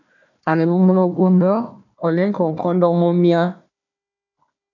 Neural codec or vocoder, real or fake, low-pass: codec, 16 kHz, 1 kbps, FunCodec, trained on Chinese and English, 50 frames a second; fake; 7.2 kHz